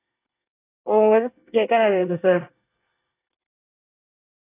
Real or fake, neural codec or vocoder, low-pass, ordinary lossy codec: fake; codec, 24 kHz, 1 kbps, SNAC; 3.6 kHz; AAC, 24 kbps